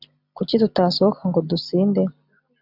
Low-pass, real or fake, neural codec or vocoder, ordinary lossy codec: 5.4 kHz; real; none; AAC, 48 kbps